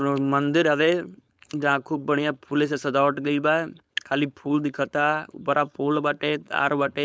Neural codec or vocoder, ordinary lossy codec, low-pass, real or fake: codec, 16 kHz, 4.8 kbps, FACodec; none; none; fake